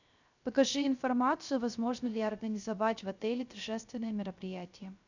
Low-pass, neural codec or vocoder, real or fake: 7.2 kHz; codec, 16 kHz, 0.3 kbps, FocalCodec; fake